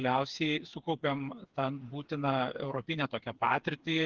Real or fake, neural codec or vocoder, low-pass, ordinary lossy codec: fake; codec, 16 kHz, 4 kbps, FreqCodec, smaller model; 7.2 kHz; Opus, 16 kbps